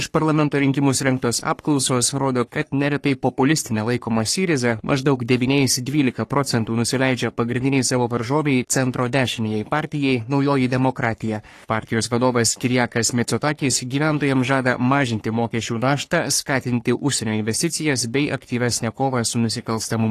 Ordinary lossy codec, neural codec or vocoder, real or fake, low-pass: AAC, 48 kbps; codec, 44.1 kHz, 3.4 kbps, Pupu-Codec; fake; 14.4 kHz